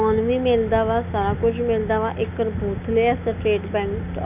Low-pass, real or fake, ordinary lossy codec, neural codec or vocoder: 3.6 kHz; real; none; none